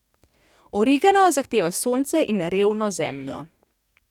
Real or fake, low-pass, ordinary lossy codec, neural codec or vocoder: fake; 19.8 kHz; none; codec, 44.1 kHz, 2.6 kbps, DAC